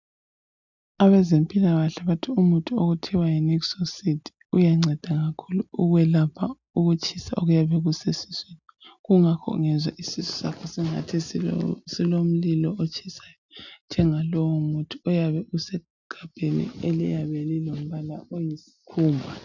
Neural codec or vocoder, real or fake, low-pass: none; real; 7.2 kHz